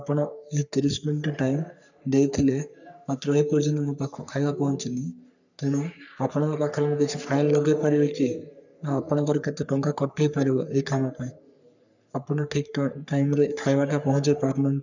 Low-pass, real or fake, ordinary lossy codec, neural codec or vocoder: 7.2 kHz; fake; none; codec, 44.1 kHz, 3.4 kbps, Pupu-Codec